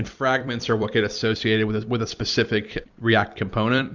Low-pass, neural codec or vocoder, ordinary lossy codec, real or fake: 7.2 kHz; vocoder, 44.1 kHz, 128 mel bands every 256 samples, BigVGAN v2; Opus, 64 kbps; fake